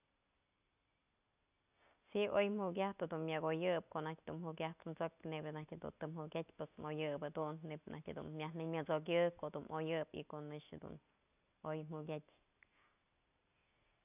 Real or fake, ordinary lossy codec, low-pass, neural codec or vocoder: real; none; 3.6 kHz; none